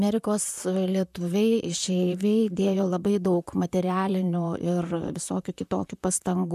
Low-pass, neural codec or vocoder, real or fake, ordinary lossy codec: 14.4 kHz; vocoder, 44.1 kHz, 128 mel bands, Pupu-Vocoder; fake; MP3, 96 kbps